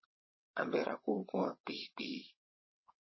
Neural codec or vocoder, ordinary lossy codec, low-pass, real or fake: vocoder, 22.05 kHz, 80 mel bands, WaveNeXt; MP3, 24 kbps; 7.2 kHz; fake